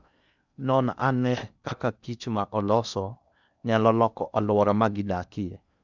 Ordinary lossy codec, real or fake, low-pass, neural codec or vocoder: none; fake; 7.2 kHz; codec, 16 kHz in and 24 kHz out, 0.8 kbps, FocalCodec, streaming, 65536 codes